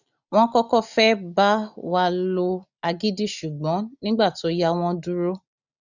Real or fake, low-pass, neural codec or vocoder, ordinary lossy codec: real; 7.2 kHz; none; none